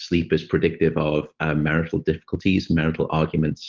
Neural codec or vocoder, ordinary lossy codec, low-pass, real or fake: none; Opus, 16 kbps; 7.2 kHz; real